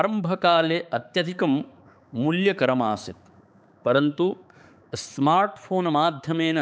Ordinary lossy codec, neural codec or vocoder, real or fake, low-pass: none; codec, 16 kHz, 4 kbps, X-Codec, HuBERT features, trained on balanced general audio; fake; none